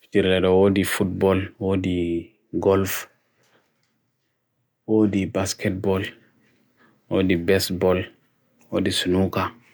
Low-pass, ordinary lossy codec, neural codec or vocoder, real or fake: none; none; none; real